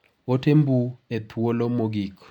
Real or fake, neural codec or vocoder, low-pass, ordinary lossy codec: real; none; 19.8 kHz; none